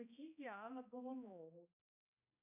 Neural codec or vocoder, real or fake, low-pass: codec, 16 kHz, 1 kbps, X-Codec, HuBERT features, trained on balanced general audio; fake; 3.6 kHz